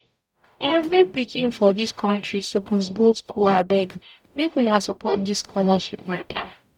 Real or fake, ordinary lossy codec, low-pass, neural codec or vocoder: fake; none; 19.8 kHz; codec, 44.1 kHz, 0.9 kbps, DAC